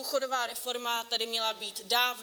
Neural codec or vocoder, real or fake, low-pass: vocoder, 44.1 kHz, 128 mel bands, Pupu-Vocoder; fake; 19.8 kHz